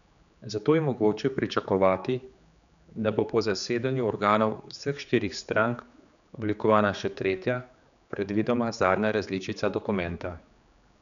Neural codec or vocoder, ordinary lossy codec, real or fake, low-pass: codec, 16 kHz, 4 kbps, X-Codec, HuBERT features, trained on general audio; none; fake; 7.2 kHz